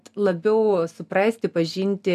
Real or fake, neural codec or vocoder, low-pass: real; none; 14.4 kHz